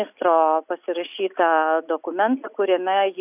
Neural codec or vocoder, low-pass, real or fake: none; 3.6 kHz; real